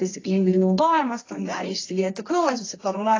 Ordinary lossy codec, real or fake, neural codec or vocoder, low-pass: AAC, 32 kbps; fake; codec, 24 kHz, 0.9 kbps, WavTokenizer, medium music audio release; 7.2 kHz